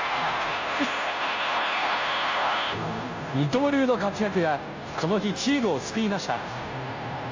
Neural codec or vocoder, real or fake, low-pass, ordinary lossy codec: codec, 16 kHz, 0.5 kbps, FunCodec, trained on Chinese and English, 25 frames a second; fake; 7.2 kHz; AAC, 48 kbps